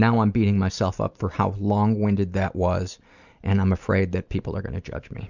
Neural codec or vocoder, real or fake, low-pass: none; real; 7.2 kHz